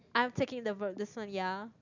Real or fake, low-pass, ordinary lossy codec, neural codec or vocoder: real; 7.2 kHz; none; none